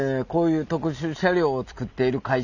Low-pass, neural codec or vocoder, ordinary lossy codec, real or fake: 7.2 kHz; none; none; real